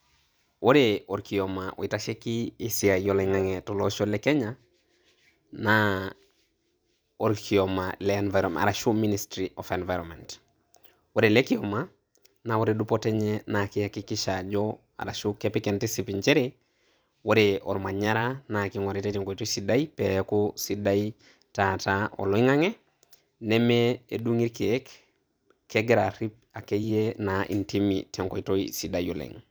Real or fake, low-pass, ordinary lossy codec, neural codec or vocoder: fake; none; none; vocoder, 44.1 kHz, 128 mel bands every 512 samples, BigVGAN v2